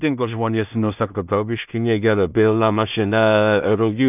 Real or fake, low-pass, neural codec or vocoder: fake; 3.6 kHz; codec, 16 kHz in and 24 kHz out, 0.4 kbps, LongCat-Audio-Codec, two codebook decoder